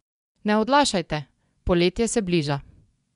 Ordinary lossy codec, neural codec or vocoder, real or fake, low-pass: none; vocoder, 24 kHz, 100 mel bands, Vocos; fake; 10.8 kHz